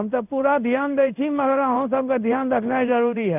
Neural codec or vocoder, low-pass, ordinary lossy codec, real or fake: codec, 16 kHz in and 24 kHz out, 1 kbps, XY-Tokenizer; 3.6 kHz; none; fake